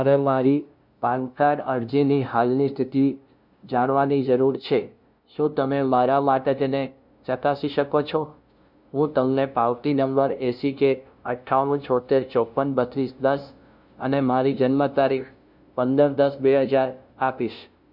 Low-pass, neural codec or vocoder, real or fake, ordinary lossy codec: 5.4 kHz; codec, 16 kHz, 0.5 kbps, FunCodec, trained on LibriTTS, 25 frames a second; fake; none